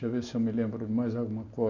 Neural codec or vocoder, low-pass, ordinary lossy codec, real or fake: none; 7.2 kHz; none; real